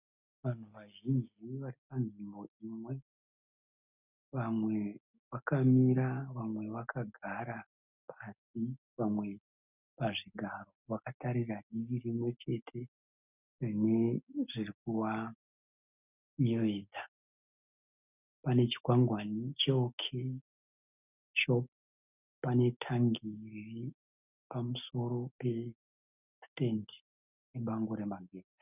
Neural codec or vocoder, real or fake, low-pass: none; real; 3.6 kHz